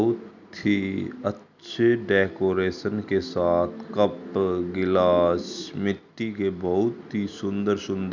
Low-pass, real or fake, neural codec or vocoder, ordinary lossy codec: 7.2 kHz; real; none; none